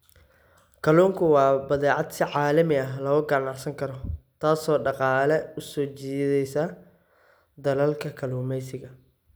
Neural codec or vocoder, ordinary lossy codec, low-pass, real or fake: none; none; none; real